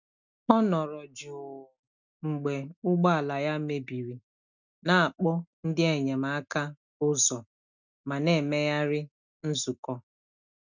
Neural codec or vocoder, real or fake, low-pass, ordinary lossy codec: none; real; 7.2 kHz; none